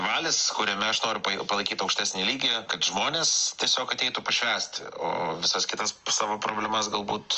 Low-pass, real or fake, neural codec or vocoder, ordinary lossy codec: 7.2 kHz; real; none; Opus, 24 kbps